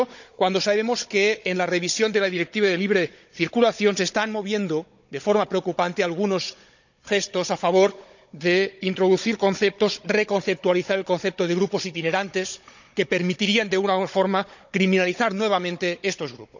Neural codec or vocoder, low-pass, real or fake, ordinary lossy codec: codec, 16 kHz, 16 kbps, FunCodec, trained on Chinese and English, 50 frames a second; 7.2 kHz; fake; none